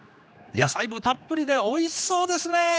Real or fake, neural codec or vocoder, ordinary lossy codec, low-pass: fake; codec, 16 kHz, 2 kbps, X-Codec, HuBERT features, trained on general audio; none; none